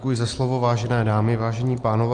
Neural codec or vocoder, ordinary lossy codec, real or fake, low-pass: none; Opus, 24 kbps; real; 9.9 kHz